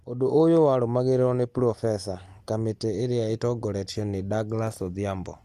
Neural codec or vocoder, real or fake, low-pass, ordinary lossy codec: none; real; 14.4 kHz; Opus, 24 kbps